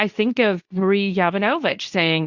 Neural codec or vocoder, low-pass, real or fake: codec, 24 kHz, 0.9 kbps, WavTokenizer, medium speech release version 2; 7.2 kHz; fake